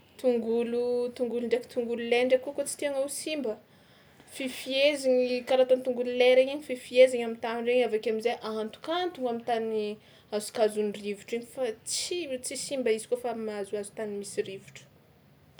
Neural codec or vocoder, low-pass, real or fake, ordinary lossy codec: none; none; real; none